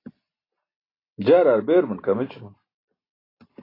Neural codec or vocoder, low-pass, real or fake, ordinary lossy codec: none; 5.4 kHz; real; AAC, 24 kbps